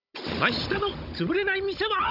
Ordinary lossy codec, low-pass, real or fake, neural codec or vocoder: none; 5.4 kHz; fake; codec, 16 kHz, 16 kbps, FunCodec, trained on Chinese and English, 50 frames a second